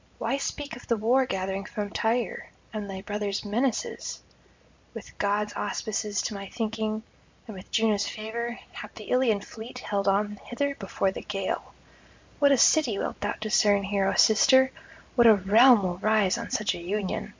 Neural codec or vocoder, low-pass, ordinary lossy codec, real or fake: none; 7.2 kHz; MP3, 64 kbps; real